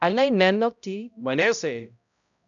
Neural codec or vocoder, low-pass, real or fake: codec, 16 kHz, 0.5 kbps, X-Codec, HuBERT features, trained on balanced general audio; 7.2 kHz; fake